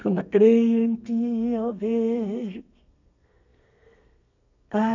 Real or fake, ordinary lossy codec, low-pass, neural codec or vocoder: fake; none; 7.2 kHz; codec, 32 kHz, 1.9 kbps, SNAC